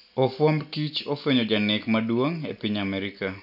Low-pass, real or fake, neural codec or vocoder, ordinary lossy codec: 5.4 kHz; real; none; none